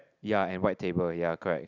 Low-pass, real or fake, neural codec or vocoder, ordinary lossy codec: 7.2 kHz; real; none; none